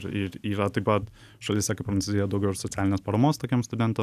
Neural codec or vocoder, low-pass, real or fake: none; 14.4 kHz; real